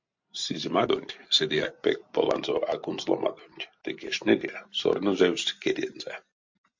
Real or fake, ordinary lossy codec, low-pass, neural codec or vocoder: real; MP3, 48 kbps; 7.2 kHz; none